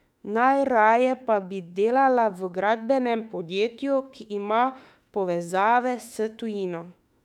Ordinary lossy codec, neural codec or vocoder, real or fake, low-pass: none; autoencoder, 48 kHz, 32 numbers a frame, DAC-VAE, trained on Japanese speech; fake; 19.8 kHz